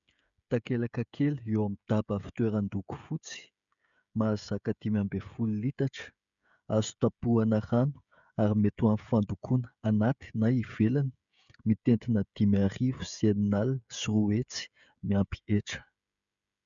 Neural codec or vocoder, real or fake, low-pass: codec, 16 kHz, 16 kbps, FreqCodec, smaller model; fake; 7.2 kHz